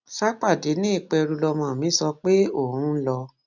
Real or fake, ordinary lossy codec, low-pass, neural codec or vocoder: real; none; 7.2 kHz; none